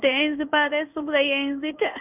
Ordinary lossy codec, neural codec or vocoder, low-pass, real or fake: none; codec, 16 kHz in and 24 kHz out, 1 kbps, XY-Tokenizer; 3.6 kHz; fake